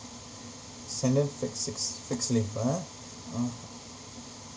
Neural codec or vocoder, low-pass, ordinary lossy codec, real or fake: none; none; none; real